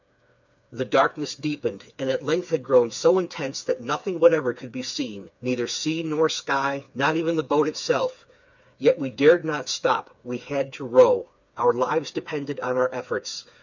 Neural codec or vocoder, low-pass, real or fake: codec, 16 kHz, 4 kbps, FreqCodec, smaller model; 7.2 kHz; fake